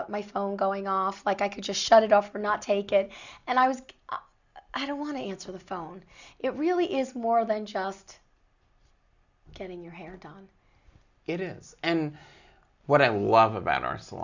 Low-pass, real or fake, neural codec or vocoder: 7.2 kHz; real; none